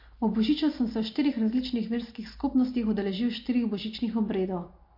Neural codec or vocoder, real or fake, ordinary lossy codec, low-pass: none; real; MP3, 32 kbps; 5.4 kHz